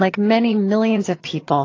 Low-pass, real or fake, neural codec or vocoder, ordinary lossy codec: 7.2 kHz; fake; vocoder, 22.05 kHz, 80 mel bands, HiFi-GAN; AAC, 32 kbps